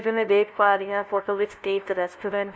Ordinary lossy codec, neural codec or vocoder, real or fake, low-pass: none; codec, 16 kHz, 0.5 kbps, FunCodec, trained on LibriTTS, 25 frames a second; fake; none